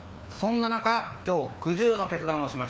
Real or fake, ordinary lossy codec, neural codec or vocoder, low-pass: fake; none; codec, 16 kHz, 2 kbps, FreqCodec, larger model; none